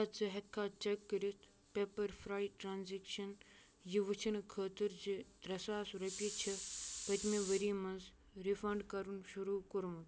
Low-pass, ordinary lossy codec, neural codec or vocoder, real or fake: none; none; none; real